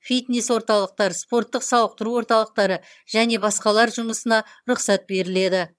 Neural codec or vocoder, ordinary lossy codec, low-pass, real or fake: vocoder, 22.05 kHz, 80 mel bands, HiFi-GAN; none; none; fake